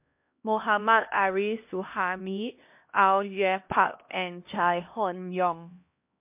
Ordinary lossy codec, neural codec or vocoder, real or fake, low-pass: MP3, 32 kbps; codec, 16 kHz, 1 kbps, X-Codec, HuBERT features, trained on LibriSpeech; fake; 3.6 kHz